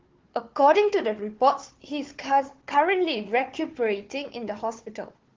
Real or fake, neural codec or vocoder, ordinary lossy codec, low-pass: fake; codec, 16 kHz, 4 kbps, FunCodec, trained on Chinese and English, 50 frames a second; Opus, 16 kbps; 7.2 kHz